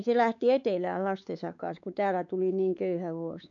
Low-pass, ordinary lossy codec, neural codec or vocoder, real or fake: 7.2 kHz; MP3, 96 kbps; codec, 16 kHz, 4 kbps, X-Codec, WavLM features, trained on Multilingual LibriSpeech; fake